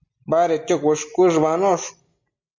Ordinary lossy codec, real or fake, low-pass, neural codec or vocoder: MP3, 64 kbps; real; 7.2 kHz; none